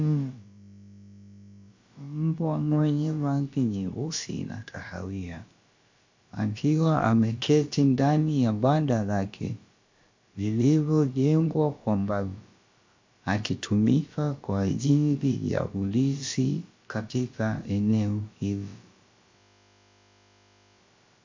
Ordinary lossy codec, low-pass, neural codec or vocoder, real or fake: MP3, 48 kbps; 7.2 kHz; codec, 16 kHz, about 1 kbps, DyCAST, with the encoder's durations; fake